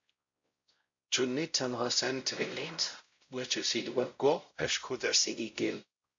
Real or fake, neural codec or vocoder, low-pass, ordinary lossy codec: fake; codec, 16 kHz, 0.5 kbps, X-Codec, WavLM features, trained on Multilingual LibriSpeech; 7.2 kHz; MP3, 48 kbps